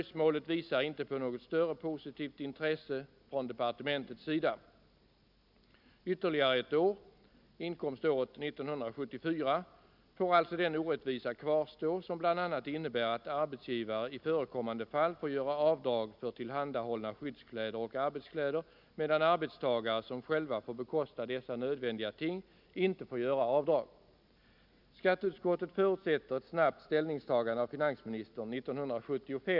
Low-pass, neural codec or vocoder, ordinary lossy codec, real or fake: 5.4 kHz; none; none; real